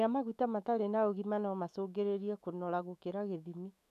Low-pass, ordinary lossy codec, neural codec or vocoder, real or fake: 14.4 kHz; none; autoencoder, 48 kHz, 128 numbers a frame, DAC-VAE, trained on Japanese speech; fake